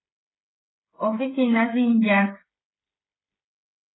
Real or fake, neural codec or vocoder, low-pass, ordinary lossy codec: fake; codec, 16 kHz, 16 kbps, FreqCodec, smaller model; 7.2 kHz; AAC, 16 kbps